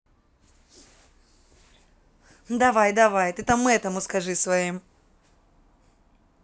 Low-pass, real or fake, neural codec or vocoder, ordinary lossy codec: none; real; none; none